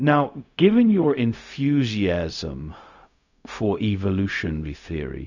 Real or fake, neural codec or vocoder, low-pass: fake; codec, 16 kHz, 0.4 kbps, LongCat-Audio-Codec; 7.2 kHz